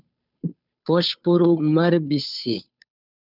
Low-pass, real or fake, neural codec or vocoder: 5.4 kHz; fake; codec, 16 kHz, 2 kbps, FunCodec, trained on Chinese and English, 25 frames a second